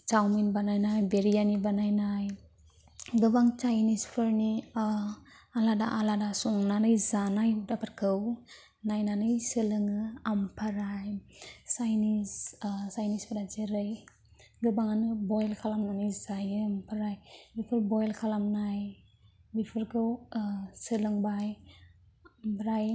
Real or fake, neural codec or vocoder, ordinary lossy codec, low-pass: real; none; none; none